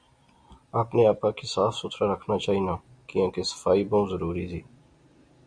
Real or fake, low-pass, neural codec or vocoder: real; 9.9 kHz; none